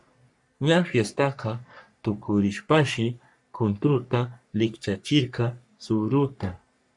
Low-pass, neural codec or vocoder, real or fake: 10.8 kHz; codec, 44.1 kHz, 3.4 kbps, Pupu-Codec; fake